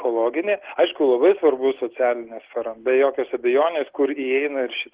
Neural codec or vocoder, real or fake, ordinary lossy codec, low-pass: none; real; Opus, 16 kbps; 3.6 kHz